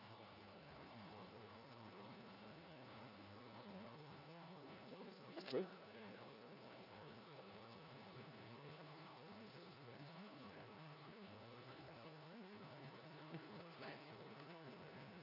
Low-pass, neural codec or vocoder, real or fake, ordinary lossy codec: 5.4 kHz; codec, 16 kHz, 1 kbps, FunCodec, trained on LibriTTS, 50 frames a second; fake; none